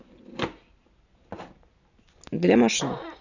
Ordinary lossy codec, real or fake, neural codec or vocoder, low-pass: none; real; none; 7.2 kHz